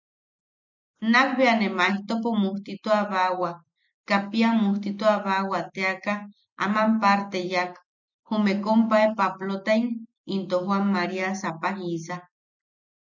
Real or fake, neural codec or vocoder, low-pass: real; none; 7.2 kHz